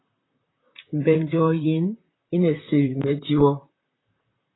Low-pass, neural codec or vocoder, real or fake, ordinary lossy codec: 7.2 kHz; vocoder, 44.1 kHz, 128 mel bands, Pupu-Vocoder; fake; AAC, 16 kbps